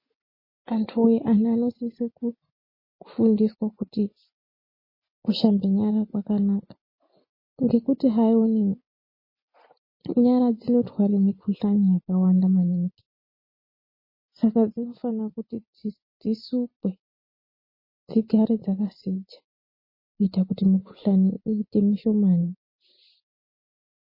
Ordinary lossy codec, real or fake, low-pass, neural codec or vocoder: MP3, 24 kbps; real; 5.4 kHz; none